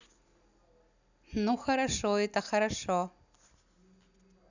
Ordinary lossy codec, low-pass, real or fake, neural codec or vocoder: none; 7.2 kHz; real; none